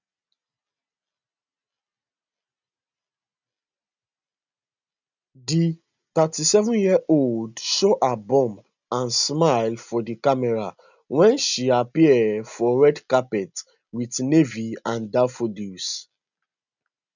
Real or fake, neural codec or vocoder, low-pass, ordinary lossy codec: real; none; 7.2 kHz; none